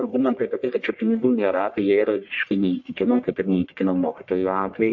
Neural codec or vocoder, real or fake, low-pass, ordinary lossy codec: codec, 44.1 kHz, 1.7 kbps, Pupu-Codec; fake; 7.2 kHz; MP3, 48 kbps